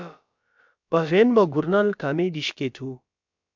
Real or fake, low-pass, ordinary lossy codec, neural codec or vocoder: fake; 7.2 kHz; MP3, 64 kbps; codec, 16 kHz, about 1 kbps, DyCAST, with the encoder's durations